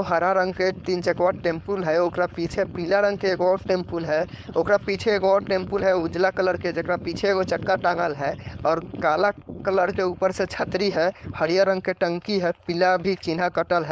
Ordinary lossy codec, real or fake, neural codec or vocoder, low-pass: none; fake; codec, 16 kHz, 4.8 kbps, FACodec; none